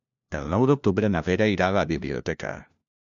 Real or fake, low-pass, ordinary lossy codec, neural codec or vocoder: fake; 7.2 kHz; MP3, 96 kbps; codec, 16 kHz, 1 kbps, FunCodec, trained on LibriTTS, 50 frames a second